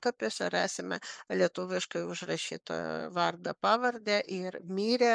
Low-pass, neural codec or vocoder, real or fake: 9.9 kHz; codec, 44.1 kHz, 7.8 kbps, Pupu-Codec; fake